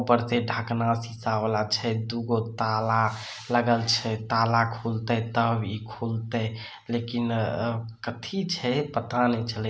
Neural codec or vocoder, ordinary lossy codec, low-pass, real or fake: none; none; none; real